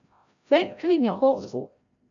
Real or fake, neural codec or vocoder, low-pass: fake; codec, 16 kHz, 0.5 kbps, FreqCodec, larger model; 7.2 kHz